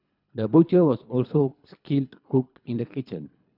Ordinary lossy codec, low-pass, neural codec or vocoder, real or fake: none; 5.4 kHz; codec, 24 kHz, 3 kbps, HILCodec; fake